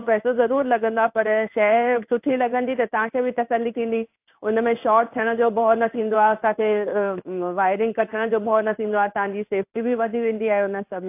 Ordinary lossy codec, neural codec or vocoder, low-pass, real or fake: AAC, 32 kbps; codec, 16 kHz in and 24 kHz out, 1 kbps, XY-Tokenizer; 3.6 kHz; fake